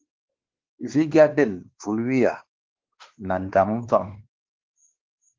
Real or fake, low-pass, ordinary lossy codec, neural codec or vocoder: fake; 7.2 kHz; Opus, 16 kbps; codec, 16 kHz, 2 kbps, X-Codec, WavLM features, trained on Multilingual LibriSpeech